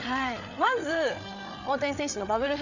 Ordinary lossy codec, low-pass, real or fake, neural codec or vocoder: none; 7.2 kHz; fake; codec, 16 kHz, 8 kbps, FreqCodec, larger model